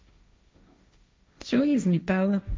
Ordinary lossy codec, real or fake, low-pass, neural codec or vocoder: none; fake; none; codec, 16 kHz, 1.1 kbps, Voila-Tokenizer